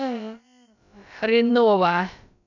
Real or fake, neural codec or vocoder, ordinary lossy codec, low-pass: fake; codec, 16 kHz, about 1 kbps, DyCAST, with the encoder's durations; none; 7.2 kHz